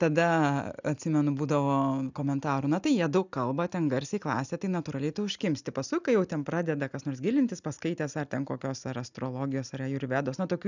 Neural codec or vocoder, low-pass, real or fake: none; 7.2 kHz; real